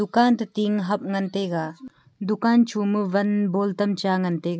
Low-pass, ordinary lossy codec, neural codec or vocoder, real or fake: none; none; none; real